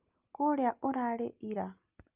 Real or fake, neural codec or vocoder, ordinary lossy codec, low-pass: real; none; Opus, 24 kbps; 3.6 kHz